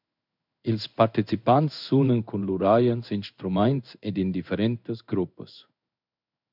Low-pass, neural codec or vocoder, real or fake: 5.4 kHz; codec, 16 kHz in and 24 kHz out, 1 kbps, XY-Tokenizer; fake